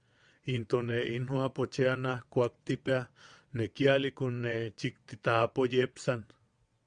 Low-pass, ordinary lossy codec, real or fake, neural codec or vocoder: 9.9 kHz; Opus, 64 kbps; fake; vocoder, 22.05 kHz, 80 mel bands, WaveNeXt